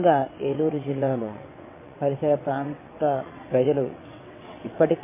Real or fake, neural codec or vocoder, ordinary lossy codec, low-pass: fake; vocoder, 22.05 kHz, 80 mel bands, WaveNeXt; MP3, 16 kbps; 3.6 kHz